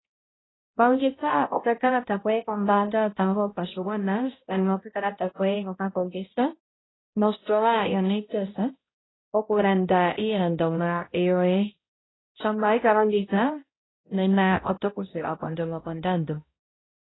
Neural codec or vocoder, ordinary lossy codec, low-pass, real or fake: codec, 16 kHz, 0.5 kbps, X-Codec, HuBERT features, trained on balanced general audio; AAC, 16 kbps; 7.2 kHz; fake